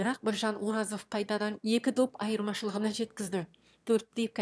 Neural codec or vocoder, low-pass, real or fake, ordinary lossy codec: autoencoder, 22.05 kHz, a latent of 192 numbers a frame, VITS, trained on one speaker; none; fake; none